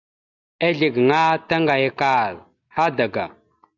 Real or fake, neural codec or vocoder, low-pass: real; none; 7.2 kHz